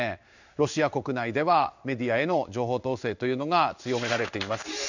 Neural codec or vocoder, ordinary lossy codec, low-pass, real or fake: codec, 16 kHz in and 24 kHz out, 1 kbps, XY-Tokenizer; none; 7.2 kHz; fake